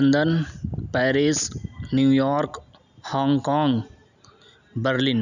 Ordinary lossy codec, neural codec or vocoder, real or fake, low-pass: none; none; real; 7.2 kHz